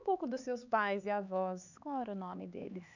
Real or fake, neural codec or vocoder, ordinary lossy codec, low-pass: fake; codec, 16 kHz, 4 kbps, X-Codec, HuBERT features, trained on LibriSpeech; none; 7.2 kHz